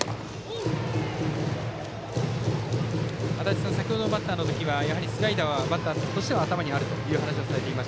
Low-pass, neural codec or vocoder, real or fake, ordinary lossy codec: none; none; real; none